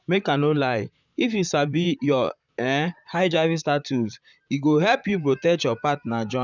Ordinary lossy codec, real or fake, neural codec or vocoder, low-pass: none; fake; vocoder, 44.1 kHz, 128 mel bands, Pupu-Vocoder; 7.2 kHz